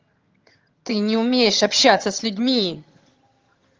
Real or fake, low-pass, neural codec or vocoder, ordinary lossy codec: fake; 7.2 kHz; vocoder, 22.05 kHz, 80 mel bands, HiFi-GAN; Opus, 24 kbps